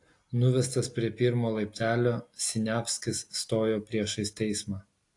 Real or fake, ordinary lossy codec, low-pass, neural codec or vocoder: real; AAC, 48 kbps; 10.8 kHz; none